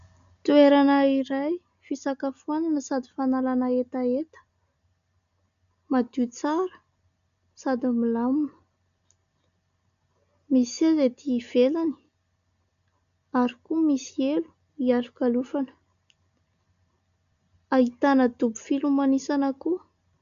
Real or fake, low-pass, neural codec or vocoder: real; 7.2 kHz; none